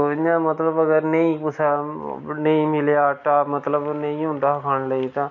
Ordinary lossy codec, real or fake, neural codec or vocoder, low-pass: none; real; none; 7.2 kHz